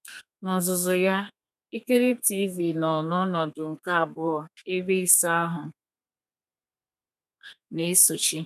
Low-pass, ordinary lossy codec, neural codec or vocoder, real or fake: 14.4 kHz; none; codec, 32 kHz, 1.9 kbps, SNAC; fake